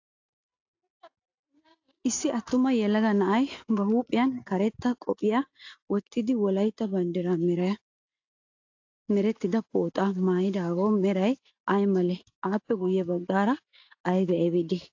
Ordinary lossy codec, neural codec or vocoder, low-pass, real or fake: AAC, 48 kbps; none; 7.2 kHz; real